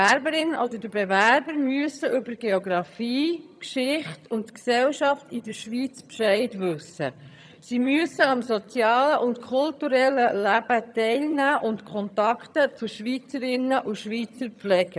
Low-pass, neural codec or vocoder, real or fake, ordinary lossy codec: none; vocoder, 22.05 kHz, 80 mel bands, HiFi-GAN; fake; none